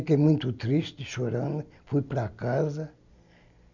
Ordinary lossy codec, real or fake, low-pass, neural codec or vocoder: none; real; 7.2 kHz; none